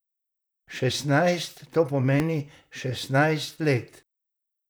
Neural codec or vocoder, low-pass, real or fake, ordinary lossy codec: vocoder, 44.1 kHz, 128 mel bands, Pupu-Vocoder; none; fake; none